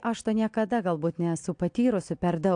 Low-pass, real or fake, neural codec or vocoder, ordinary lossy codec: 9.9 kHz; real; none; AAC, 64 kbps